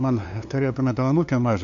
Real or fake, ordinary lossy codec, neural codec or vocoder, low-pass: fake; MP3, 48 kbps; codec, 16 kHz, 2 kbps, FunCodec, trained on LibriTTS, 25 frames a second; 7.2 kHz